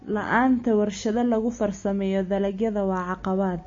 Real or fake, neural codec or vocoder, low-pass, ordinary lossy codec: real; none; 7.2 kHz; MP3, 32 kbps